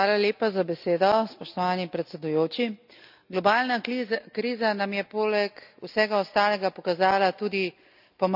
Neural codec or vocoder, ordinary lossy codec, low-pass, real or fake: none; none; 5.4 kHz; real